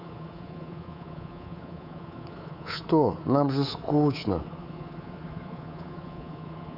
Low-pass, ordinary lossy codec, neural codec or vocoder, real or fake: 5.4 kHz; none; codec, 24 kHz, 3.1 kbps, DualCodec; fake